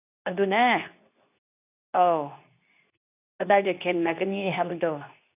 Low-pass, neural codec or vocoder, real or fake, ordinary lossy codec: 3.6 kHz; codec, 24 kHz, 0.9 kbps, WavTokenizer, medium speech release version 2; fake; none